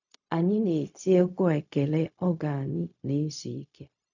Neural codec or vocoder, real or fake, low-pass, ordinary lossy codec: codec, 16 kHz, 0.4 kbps, LongCat-Audio-Codec; fake; 7.2 kHz; none